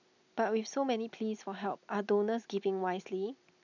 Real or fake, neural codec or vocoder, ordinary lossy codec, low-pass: real; none; none; 7.2 kHz